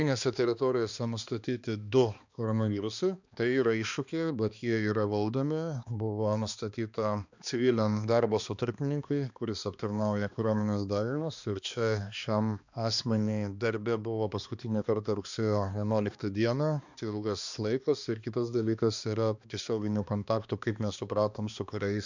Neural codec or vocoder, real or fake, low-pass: codec, 16 kHz, 2 kbps, X-Codec, HuBERT features, trained on balanced general audio; fake; 7.2 kHz